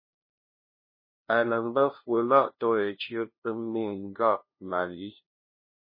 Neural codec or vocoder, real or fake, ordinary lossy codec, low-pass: codec, 16 kHz, 0.5 kbps, FunCodec, trained on LibriTTS, 25 frames a second; fake; MP3, 24 kbps; 5.4 kHz